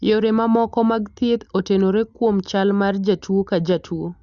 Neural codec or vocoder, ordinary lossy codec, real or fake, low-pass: none; Opus, 64 kbps; real; 7.2 kHz